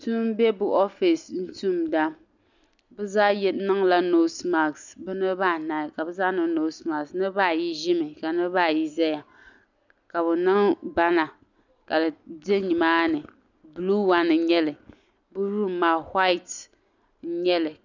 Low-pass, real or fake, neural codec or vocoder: 7.2 kHz; real; none